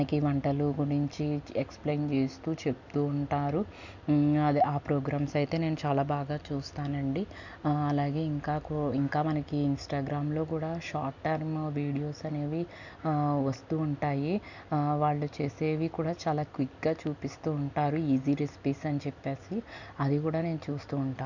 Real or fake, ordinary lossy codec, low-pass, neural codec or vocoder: real; none; 7.2 kHz; none